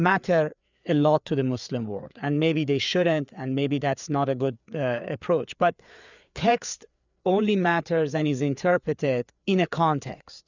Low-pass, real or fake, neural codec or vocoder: 7.2 kHz; fake; codec, 16 kHz, 4 kbps, FreqCodec, larger model